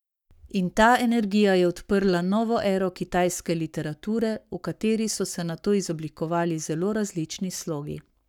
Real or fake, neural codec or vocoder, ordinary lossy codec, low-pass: fake; codec, 44.1 kHz, 7.8 kbps, Pupu-Codec; none; 19.8 kHz